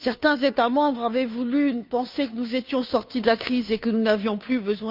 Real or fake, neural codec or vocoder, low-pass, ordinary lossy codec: fake; codec, 16 kHz, 2 kbps, FunCodec, trained on Chinese and English, 25 frames a second; 5.4 kHz; none